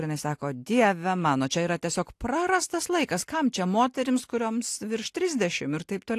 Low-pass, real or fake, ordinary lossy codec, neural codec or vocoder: 14.4 kHz; real; AAC, 64 kbps; none